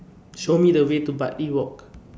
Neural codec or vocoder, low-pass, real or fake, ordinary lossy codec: none; none; real; none